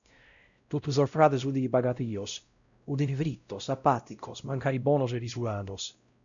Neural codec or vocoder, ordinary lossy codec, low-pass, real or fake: codec, 16 kHz, 0.5 kbps, X-Codec, WavLM features, trained on Multilingual LibriSpeech; MP3, 96 kbps; 7.2 kHz; fake